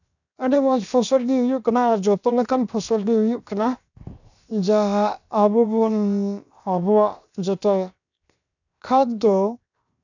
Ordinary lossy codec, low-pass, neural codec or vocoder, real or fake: none; 7.2 kHz; codec, 16 kHz, 0.7 kbps, FocalCodec; fake